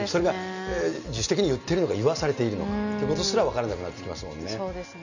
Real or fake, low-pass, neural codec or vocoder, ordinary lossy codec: real; 7.2 kHz; none; none